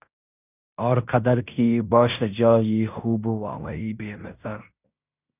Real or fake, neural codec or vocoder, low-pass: fake; codec, 16 kHz in and 24 kHz out, 0.9 kbps, LongCat-Audio-Codec, fine tuned four codebook decoder; 3.6 kHz